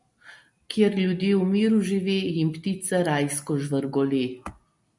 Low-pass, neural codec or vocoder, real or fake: 10.8 kHz; none; real